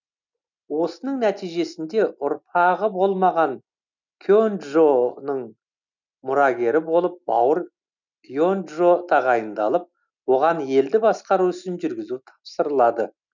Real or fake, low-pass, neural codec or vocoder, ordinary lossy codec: real; 7.2 kHz; none; none